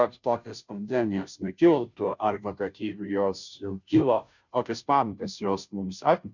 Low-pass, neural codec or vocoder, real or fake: 7.2 kHz; codec, 16 kHz, 0.5 kbps, FunCodec, trained on Chinese and English, 25 frames a second; fake